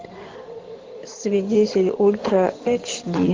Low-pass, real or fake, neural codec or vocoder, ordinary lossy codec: 7.2 kHz; fake; codec, 16 kHz in and 24 kHz out, 2.2 kbps, FireRedTTS-2 codec; Opus, 16 kbps